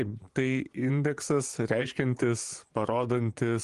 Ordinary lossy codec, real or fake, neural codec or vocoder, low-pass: Opus, 24 kbps; fake; vocoder, 24 kHz, 100 mel bands, Vocos; 10.8 kHz